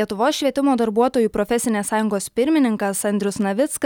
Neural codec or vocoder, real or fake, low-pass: none; real; 19.8 kHz